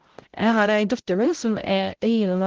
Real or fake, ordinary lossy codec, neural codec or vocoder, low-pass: fake; Opus, 16 kbps; codec, 16 kHz, 0.5 kbps, X-Codec, HuBERT features, trained on balanced general audio; 7.2 kHz